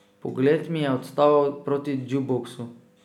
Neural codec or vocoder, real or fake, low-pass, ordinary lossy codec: none; real; 19.8 kHz; none